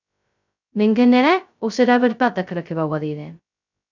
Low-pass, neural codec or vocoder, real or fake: 7.2 kHz; codec, 16 kHz, 0.2 kbps, FocalCodec; fake